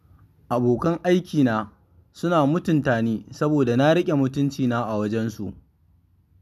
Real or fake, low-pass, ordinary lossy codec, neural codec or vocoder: real; 14.4 kHz; none; none